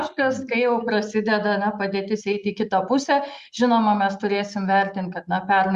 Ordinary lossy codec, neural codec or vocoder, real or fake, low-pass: Opus, 64 kbps; none; real; 10.8 kHz